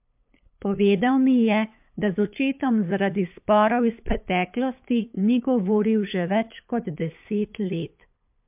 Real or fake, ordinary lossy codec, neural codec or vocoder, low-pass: fake; MP3, 32 kbps; codec, 16 kHz, 8 kbps, FunCodec, trained on LibriTTS, 25 frames a second; 3.6 kHz